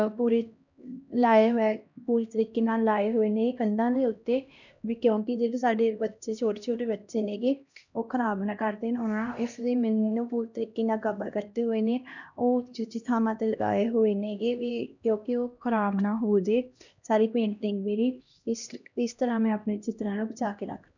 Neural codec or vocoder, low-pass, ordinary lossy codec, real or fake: codec, 16 kHz, 1 kbps, X-Codec, HuBERT features, trained on LibriSpeech; 7.2 kHz; none; fake